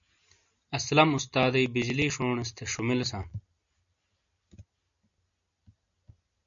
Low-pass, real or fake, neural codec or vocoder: 7.2 kHz; real; none